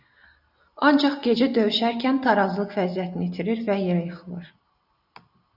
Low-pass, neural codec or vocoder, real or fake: 5.4 kHz; none; real